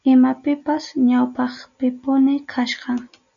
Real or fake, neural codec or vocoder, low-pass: real; none; 7.2 kHz